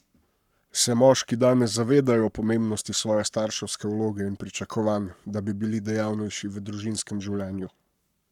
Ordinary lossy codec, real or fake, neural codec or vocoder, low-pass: none; fake; codec, 44.1 kHz, 7.8 kbps, Pupu-Codec; 19.8 kHz